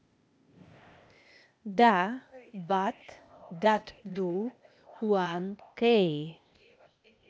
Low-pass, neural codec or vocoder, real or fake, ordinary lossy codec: none; codec, 16 kHz, 0.8 kbps, ZipCodec; fake; none